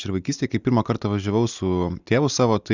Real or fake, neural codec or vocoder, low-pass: real; none; 7.2 kHz